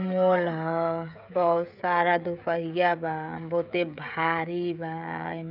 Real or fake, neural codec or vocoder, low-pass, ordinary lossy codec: fake; codec, 16 kHz, 16 kbps, FreqCodec, smaller model; 5.4 kHz; none